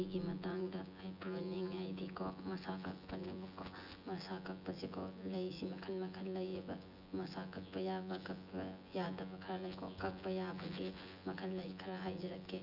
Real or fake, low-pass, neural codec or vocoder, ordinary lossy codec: fake; 5.4 kHz; vocoder, 24 kHz, 100 mel bands, Vocos; none